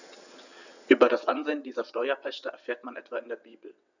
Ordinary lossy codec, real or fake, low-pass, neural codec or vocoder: none; fake; 7.2 kHz; vocoder, 22.05 kHz, 80 mel bands, WaveNeXt